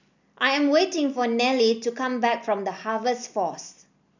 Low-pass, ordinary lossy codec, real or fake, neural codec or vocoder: 7.2 kHz; none; real; none